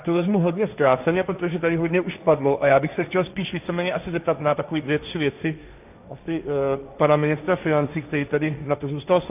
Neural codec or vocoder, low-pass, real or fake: codec, 16 kHz, 1.1 kbps, Voila-Tokenizer; 3.6 kHz; fake